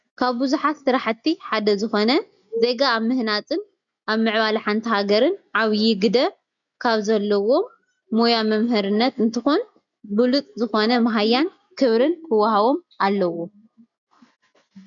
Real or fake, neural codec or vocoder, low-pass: real; none; 7.2 kHz